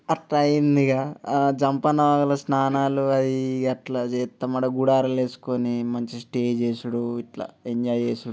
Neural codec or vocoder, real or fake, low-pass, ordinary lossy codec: none; real; none; none